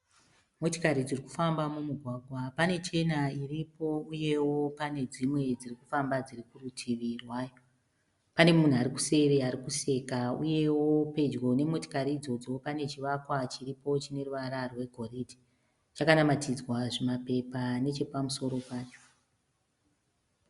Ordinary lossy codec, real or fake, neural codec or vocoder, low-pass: Opus, 64 kbps; real; none; 10.8 kHz